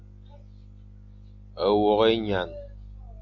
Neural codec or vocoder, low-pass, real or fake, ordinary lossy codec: none; 7.2 kHz; real; AAC, 48 kbps